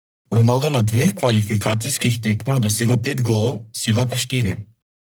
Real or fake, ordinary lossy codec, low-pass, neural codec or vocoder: fake; none; none; codec, 44.1 kHz, 1.7 kbps, Pupu-Codec